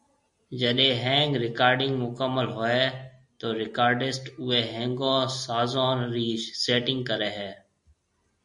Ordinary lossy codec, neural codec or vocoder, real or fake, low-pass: MP3, 48 kbps; none; real; 10.8 kHz